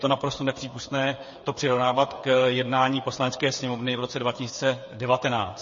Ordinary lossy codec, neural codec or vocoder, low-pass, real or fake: MP3, 32 kbps; codec, 16 kHz, 8 kbps, FreqCodec, smaller model; 7.2 kHz; fake